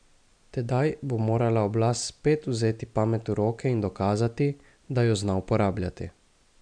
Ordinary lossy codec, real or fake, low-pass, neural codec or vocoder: MP3, 96 kbps; real; 9.9 kHz; none